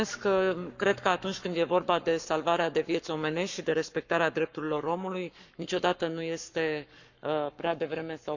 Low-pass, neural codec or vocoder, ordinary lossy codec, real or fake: 7.2 kHz; codec, 44.1 kHz, 7.8 kbps, Pupu-Codec; none; fake